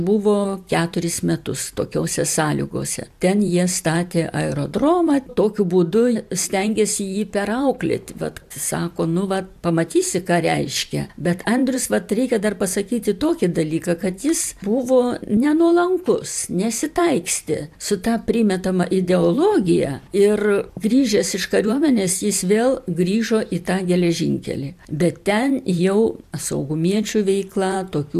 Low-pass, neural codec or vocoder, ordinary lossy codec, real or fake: 14.4 kHz; vocoder, 44.1 kHz, 128 mel bands every 512 samples, BigVGAN v2; AAC, 96 kbps; fake